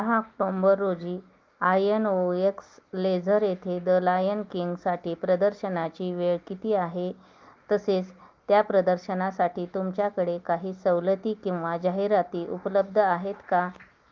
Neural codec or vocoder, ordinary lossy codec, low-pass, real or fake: none; Opus, 32 kbps; 7.2 kHz; real